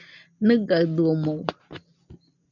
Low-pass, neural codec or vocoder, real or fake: 7.2 kHz; none; real